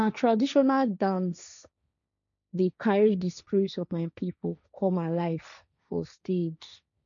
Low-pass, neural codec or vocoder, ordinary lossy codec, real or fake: 7.2 kHz; codec, 16 kHz, 1.1 kbps, Voila-Tokenizer; none; fake